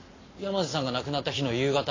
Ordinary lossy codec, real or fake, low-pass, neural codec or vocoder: AAC, 32 kbps; real; 7.2 kHz; none